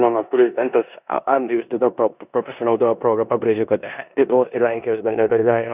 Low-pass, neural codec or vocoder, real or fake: 3.6 kHz; codec, 16 kHz in and 24 kHz out, 0.9 kbps, LongCat-Audio-Codec, four codebook decoder; fake